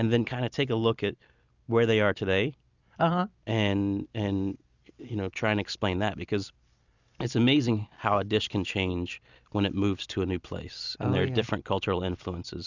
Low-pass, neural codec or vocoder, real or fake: 7.2 kHz; none; real